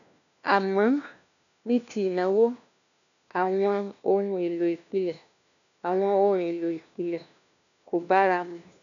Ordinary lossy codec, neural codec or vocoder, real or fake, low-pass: none; codec, 16 kHz, 1 kbps, FunCodec, trained on LibriTTS, 50 frames a second; fake; 7.2 kHz